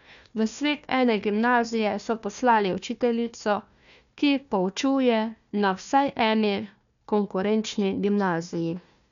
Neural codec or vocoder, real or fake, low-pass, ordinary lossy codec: codec, 16 kHz, 1 kbps, FunCodec, trained on Chinese and English, 50 frames a second; fake; 7.2 kHz; none